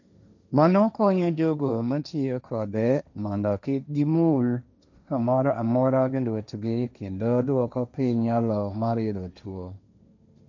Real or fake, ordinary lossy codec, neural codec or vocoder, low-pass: fake; none; codec, 16 kHz, 1.1 kbps, Voila-Tokenizer; 7.2 kHz